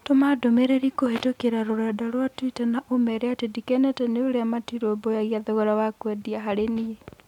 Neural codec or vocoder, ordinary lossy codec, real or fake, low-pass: none; none; real; 19.8 kHz